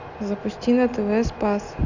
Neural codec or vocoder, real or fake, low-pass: none; real; 7.2 kHz